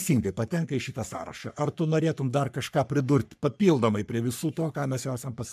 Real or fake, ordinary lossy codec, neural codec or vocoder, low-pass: fake; MP3, 96 kbps; codec, 44.1 kHz, 3.4 kbps, Pupu-Codec; 14.4 kHz